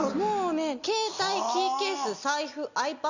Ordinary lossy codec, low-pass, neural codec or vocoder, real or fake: none; 7.2 kHz; none; real